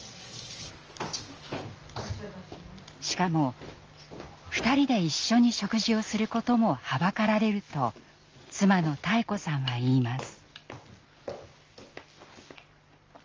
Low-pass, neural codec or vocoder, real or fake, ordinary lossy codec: 7.2 kHz; none; real; Opus, 24 kbps